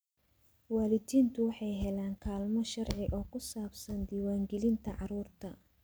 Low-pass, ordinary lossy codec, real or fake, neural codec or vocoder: none; none; real; none